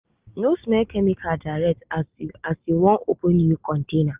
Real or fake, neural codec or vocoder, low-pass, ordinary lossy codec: real; none; 3.6 kHz; Opus, 24 kbps